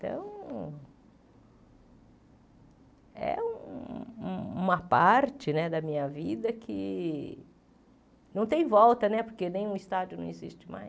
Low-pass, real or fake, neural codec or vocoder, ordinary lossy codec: none; real; none; none